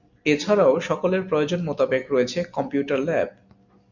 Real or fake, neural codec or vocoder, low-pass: real; none; 7.2 kHz